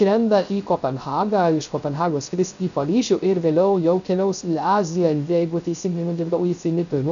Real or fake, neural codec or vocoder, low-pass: fake; codec, 16 kHz, 0.3 kbps, FocalCodec; 7.2 kHz